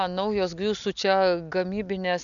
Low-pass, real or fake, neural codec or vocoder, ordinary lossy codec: 7.2 kHz; real; none; MP3, 96 kbps